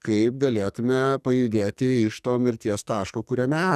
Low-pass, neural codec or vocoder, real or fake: 14.4 kHz; codec, 44.1 kHz, 2.6 kbps, SNAC; fake